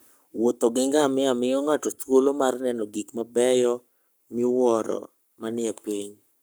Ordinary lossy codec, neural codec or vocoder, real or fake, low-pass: none; codec, 44.1 kHz, 7.8 kbps, Pupu-Codec; fake; none